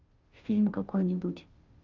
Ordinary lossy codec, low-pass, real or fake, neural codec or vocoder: Opus, 32 kbps; 7.2 kHz; fake; codec, 16 kHz, 0.5 kbps, FunCodec, trained on Chinese and English, 25 frames a second